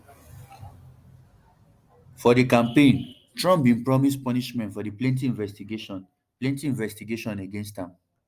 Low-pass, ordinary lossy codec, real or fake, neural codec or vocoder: 14.4 kHz; Opus, 32 kbps; real; none